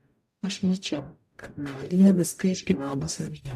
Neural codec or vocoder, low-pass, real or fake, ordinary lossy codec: codec, 44.1 kHz, 0.9 kbps, DAC; 14.4 kHz; fake; AAC, 96 kbps